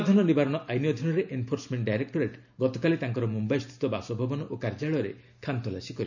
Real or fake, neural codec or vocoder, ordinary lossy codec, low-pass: real; none; none; 7.2 kHz